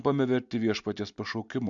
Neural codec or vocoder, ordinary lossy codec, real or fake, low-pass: none; MP3, 64 kbps; real; 7.2 kHz